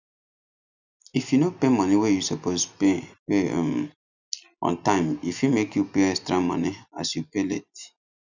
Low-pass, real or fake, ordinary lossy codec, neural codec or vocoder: 7.2 kHz; real; none; none